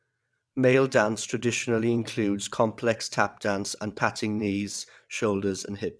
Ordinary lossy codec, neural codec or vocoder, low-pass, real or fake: none; vocoder, 22.05 kHz, 80 mel bands, WaveNeXt; none; fake